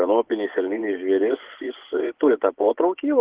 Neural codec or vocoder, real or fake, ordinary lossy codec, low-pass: vocoder, 24 kHz, 100 mel bands, Vocos; fake; Opus, 16 kbps; 3.6 kHz